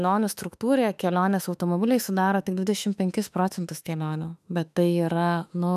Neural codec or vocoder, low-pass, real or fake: autoencoder, 48 kHz, 32 numbers a frame, DAC-VAE, trained on Japanese speech; 14.4 kHz; fake